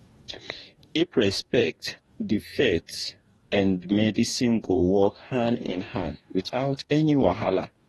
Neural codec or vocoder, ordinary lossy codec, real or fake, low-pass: codec, 44.1 kHz, 2.6 kbps, DAC; AAC, 32 kbps; fake; 19.8 kHz